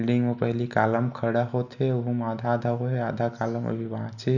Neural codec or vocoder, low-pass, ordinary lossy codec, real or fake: none; 7.2 kHz; none; real